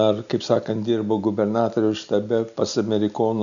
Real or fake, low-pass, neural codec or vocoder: real; 7.2 kHz; none